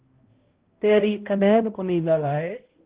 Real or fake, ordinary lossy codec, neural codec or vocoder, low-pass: fake; Opus, 16 kbps; codec, 16 kHz, 0.5 kbps, X-Codec, HuBERT features, trained on balanced general audio; 3.6 kHz